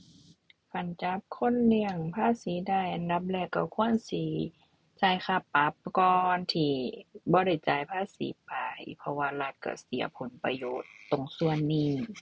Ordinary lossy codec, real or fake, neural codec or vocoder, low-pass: none; real; none; none